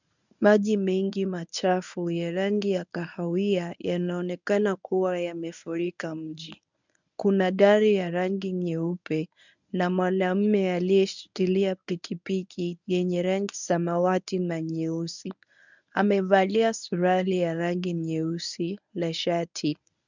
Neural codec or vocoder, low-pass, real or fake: codec, 24 kHz, 0.9 kbps, WavTokenizer, medium speech release version 1; 7.2 kHz; fake